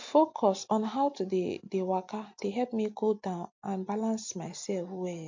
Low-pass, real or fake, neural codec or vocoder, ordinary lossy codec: 7.2 kHz; real; none; MP3, 48 kbps